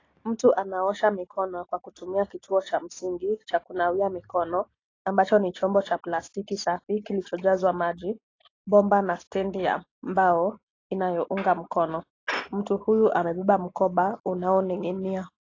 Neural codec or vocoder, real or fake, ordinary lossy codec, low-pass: none; real; AAC, 32 kbps; 7.2 kHz